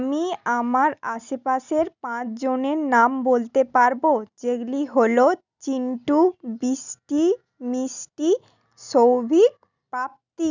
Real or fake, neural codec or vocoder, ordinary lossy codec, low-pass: real; none; none; 7.2 kHz